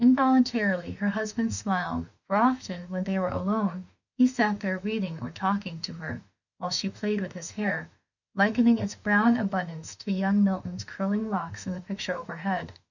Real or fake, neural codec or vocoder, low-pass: fake; autoencoder, 48 kHz, 32 numbers a frame, DAC-VAE, trained on Japanese speech; 7.2 kHz